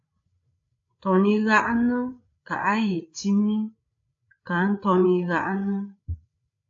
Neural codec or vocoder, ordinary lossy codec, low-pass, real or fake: codec, 16 kHz, 8 kbps, FreqCodec, larger model; AAC, 48 kbps; 7.2 kHz; fake